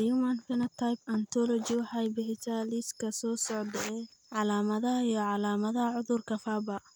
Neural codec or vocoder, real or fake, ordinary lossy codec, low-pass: none; real; none; none